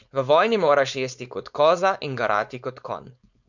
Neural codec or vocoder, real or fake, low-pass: codec, 16 kHz, 4.8 kbps, FACodec; fake; 7.2 kHz